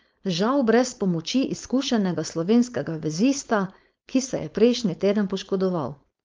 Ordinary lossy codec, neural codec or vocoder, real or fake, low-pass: Opus, 32 kbps; codec, 16 kHz, 4.8 kbps, FACodec; fake; 7.2 kHz